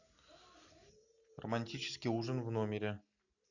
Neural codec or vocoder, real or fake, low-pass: none; real; 7.2 kHz